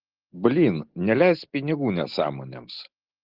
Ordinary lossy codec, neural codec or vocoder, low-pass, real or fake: Opus, 16 kbps; none; 5.4 kHz; real